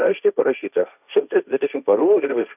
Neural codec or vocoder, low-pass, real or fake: codec, 16 kHz, 1.1 kbps, Voila-Tokenizer; 3.6 kHz; fake